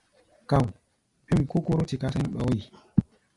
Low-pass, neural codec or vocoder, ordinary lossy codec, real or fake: 10.8 kHz; none; AAC, 64 kbps; real